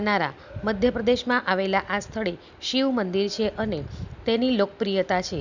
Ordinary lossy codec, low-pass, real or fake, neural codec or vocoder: none; 7.2 kHz; real; none